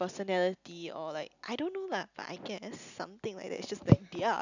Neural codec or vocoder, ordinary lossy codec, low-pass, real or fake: none; none; 7.2 kHz; real